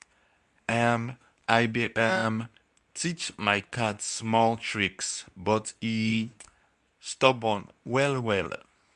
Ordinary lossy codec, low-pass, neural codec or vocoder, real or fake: none; 10.8 kHz; codec, 24 kHz, 0.9 kbps, WavTokenizer, medium speech release version 2; fake